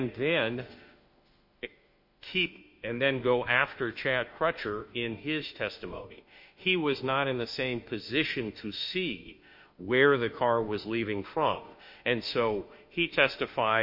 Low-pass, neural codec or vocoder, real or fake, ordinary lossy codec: 5.4 kHz; autoencoder, 48 kHz, 32 numbers a frame, DAC-VAE, trained on Japanese speech; fake; MP3, 32 kbps